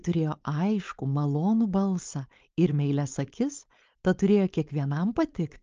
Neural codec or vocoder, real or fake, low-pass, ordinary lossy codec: codec, 16 kHz, 4.8 kbps, FACodec; fake; 7.2 kHz; Opus, 24 kbps